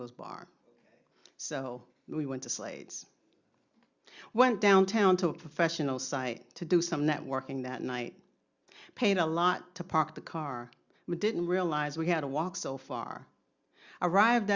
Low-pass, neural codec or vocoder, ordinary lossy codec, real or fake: 7.2 kHz; none; Opus, 64 kbps; real